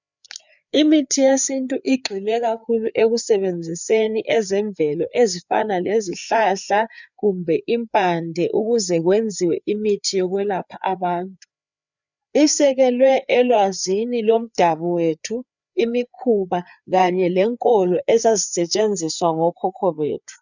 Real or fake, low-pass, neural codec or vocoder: fake; 7.2 kHz; codec, 16 kHz, 4 kbps, FreqCodec, larger model